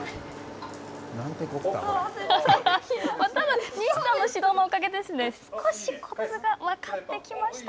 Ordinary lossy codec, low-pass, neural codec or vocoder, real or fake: none; none; none; real